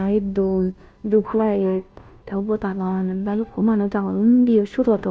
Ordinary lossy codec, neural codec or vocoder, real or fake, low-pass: none; codec, 16 kHz, 0.5 kbps, FunCodec, trained on Chinese and English, 25 frames a second; fake; none